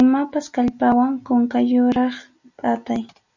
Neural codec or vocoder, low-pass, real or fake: none; 7.2 kHz; real